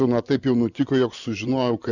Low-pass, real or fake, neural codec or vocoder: 7.2 kHz; fake; vocoder, 24 kHz, 100 mel bands, Vocos